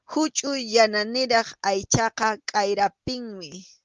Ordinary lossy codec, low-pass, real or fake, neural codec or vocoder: Opus, 24 kbps; 7.2 kHz; real; none